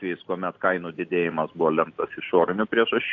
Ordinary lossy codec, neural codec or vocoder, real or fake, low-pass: AAC, 48 kbps; none; real; 7.2 kHz